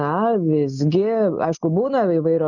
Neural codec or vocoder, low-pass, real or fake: none; 7.2 kHz; real